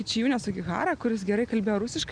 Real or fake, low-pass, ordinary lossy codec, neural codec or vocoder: real; 9.9 kHz; Opus, 64 kbps; none